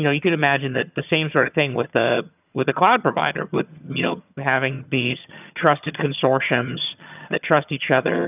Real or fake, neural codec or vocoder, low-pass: fake; vocoder, 22.05 kHz, 80 mel bands, HiFi-GAN; 3.6 kHz